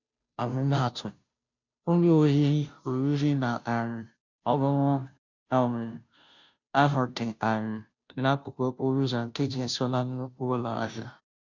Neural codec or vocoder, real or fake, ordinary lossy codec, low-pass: codec, 16 kHz, 0.5 kbps, FunCodec, trained on Chinese and English, 25 frames a second; fake; none; 7.2 kHz